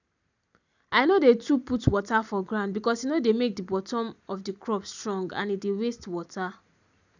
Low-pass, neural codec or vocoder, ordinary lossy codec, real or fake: 7.2 kHz; none; none; real